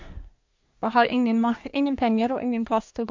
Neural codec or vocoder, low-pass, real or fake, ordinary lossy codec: codec, 24 kHz, 1 kbps, SNAC; 7.2 kHz; fake; MP3, 48 kbps